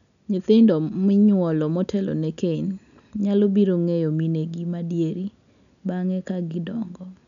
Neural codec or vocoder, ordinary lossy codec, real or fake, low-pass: none; none; real; 7.2 kHz